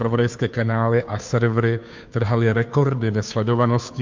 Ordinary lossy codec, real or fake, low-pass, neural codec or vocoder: MP3, 64 kbps; fake; 7.2 kHz; codec, 16 kHz, 2 kbps, FunCodec, trained on Chinese and English, 25 frames a second